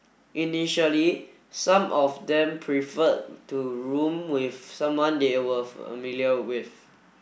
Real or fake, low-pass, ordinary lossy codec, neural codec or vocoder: real; none; none; none